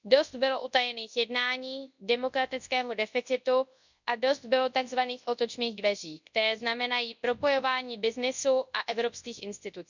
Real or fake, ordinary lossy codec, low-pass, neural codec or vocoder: fake; none; 7.2 kHz; codec, 24 kHz, 0.9 kbps, WavTokenizer, large speech release